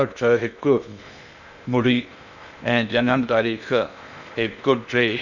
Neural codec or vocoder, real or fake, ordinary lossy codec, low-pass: codec, 16 kHz in and 24 kHz out, 0.6 kbps, FocalCodec, streaming, 2048 codes; fake; none; 7.2 kHz